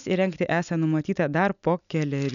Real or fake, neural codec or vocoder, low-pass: real; none; 7.2 kHz